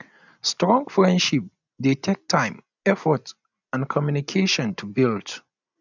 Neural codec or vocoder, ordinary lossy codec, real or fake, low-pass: none; none; real; 7.2 kHz